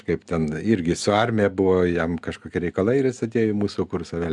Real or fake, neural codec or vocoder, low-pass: real; none; 10.8 kHz